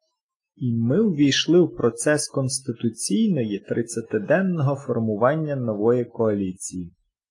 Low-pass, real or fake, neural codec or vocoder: 10.8 kHz; real; none